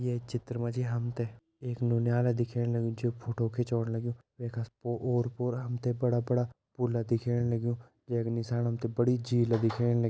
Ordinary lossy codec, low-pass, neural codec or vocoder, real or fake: none; none; none; real